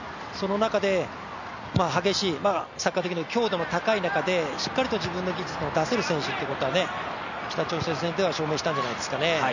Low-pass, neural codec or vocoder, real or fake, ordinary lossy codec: 7.2 kHz; none; real; none